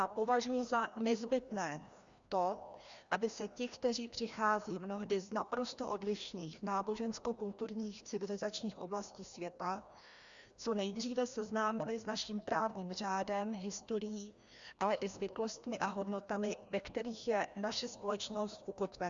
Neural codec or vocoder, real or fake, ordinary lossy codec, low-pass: codec, 16 kHz, 1 kbps, FreqCodec, larger model; fake; Opus, 64 kbps; 7.2 kHz